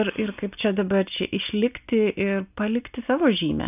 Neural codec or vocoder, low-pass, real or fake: none; 3.6 kHz; real